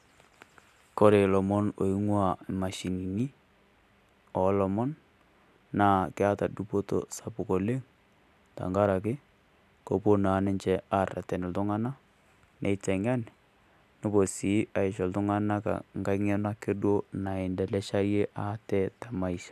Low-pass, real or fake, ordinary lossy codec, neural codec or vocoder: 14.4 kHz; real; none; none